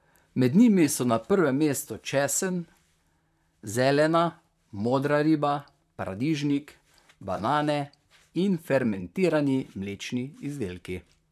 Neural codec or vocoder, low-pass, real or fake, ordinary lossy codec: vocoder, 44.1 kHz, 128 mel bands, Pupu-Vocoder; 14.4 kHz; fake; none